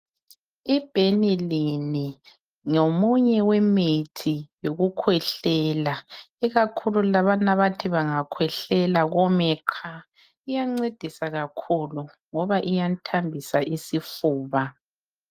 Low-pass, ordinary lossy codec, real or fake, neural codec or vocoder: 14.4 kHz; Opus, 32 kbps; real; none